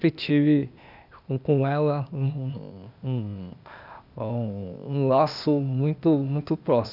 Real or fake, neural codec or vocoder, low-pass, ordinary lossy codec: fake; codec, 16 kHz, 0.8 kbps, ZipCodec; 5.4 kHz; none